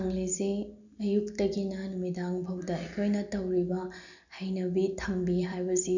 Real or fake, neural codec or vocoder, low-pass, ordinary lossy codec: real; none; 7.2 kHz; none